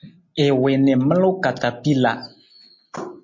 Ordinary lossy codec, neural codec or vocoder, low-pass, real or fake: MP3, 32 kbps; none; 7.2 kHz; real